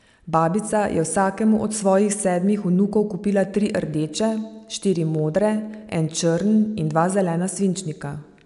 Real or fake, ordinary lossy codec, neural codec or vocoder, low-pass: real; none; none; 10.8 kHz